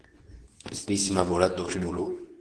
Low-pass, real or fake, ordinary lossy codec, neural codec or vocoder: 10.8 kHz; fake; Opus, 16 kbps; codec, 24 kHz, 0.9 kbps, WavTokenizer, medium speech release version 2